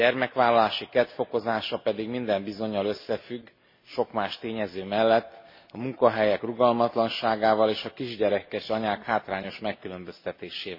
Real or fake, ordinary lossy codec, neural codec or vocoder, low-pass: real; MP3, 24 kbps; none; 5.4 kHz